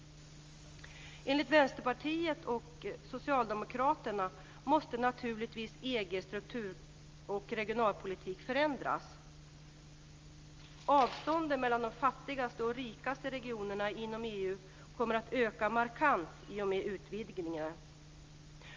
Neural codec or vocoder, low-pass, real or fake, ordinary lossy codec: none; 7.2 kHz; real; Opus, 32 kbps